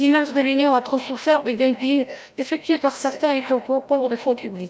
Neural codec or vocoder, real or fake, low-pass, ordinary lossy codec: codec, 16 kHz, 0.5 kbps, FreqCodec, larger model; fake; none; none